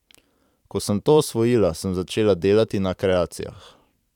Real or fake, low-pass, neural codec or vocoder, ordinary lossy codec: fake; 19.8 kHz; vocoder, 44.1 kHz, 128 mel bands every 512 samples, BigVGAN v2; none